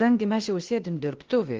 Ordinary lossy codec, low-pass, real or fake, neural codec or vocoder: Opus, 16 kbps; 7.2 kHz; fake; codec, 16 kHz, 0.8 kbps, ZipCodec